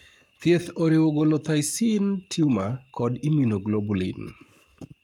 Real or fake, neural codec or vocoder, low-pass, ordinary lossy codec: fake; codec, 44.1 kHz, 7.8 kbps, Pupu-Codec; 19.8 kHz; none